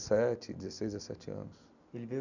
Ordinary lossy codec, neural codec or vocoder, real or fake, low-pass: none; none; real; 7.2 kHz